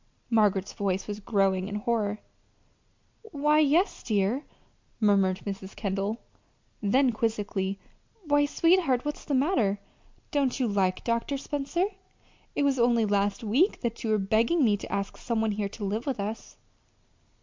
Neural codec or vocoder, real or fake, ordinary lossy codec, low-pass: none; real; MP3, 64 kbps; 7.2 kHz